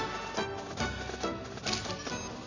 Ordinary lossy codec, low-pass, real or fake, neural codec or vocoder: none; 7.2 kHz; real; none